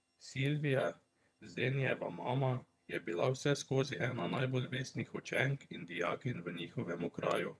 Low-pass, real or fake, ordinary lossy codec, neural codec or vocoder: none; fake; none; vocoder, 22.05 kHz, 80 mel bands, HiFi-GAN